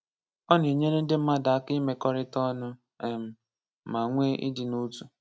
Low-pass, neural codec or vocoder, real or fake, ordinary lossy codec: none; none; real; none